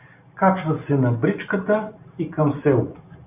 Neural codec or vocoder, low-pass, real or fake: none; 3.6 kHz; real